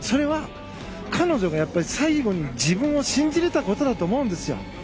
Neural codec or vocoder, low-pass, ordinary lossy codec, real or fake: none; none; none; real